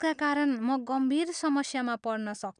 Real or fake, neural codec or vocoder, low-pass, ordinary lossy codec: real; none; 9.9 kHz; none